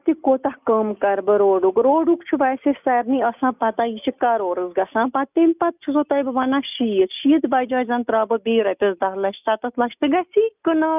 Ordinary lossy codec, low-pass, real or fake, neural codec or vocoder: none; 3.6 kHz; real; none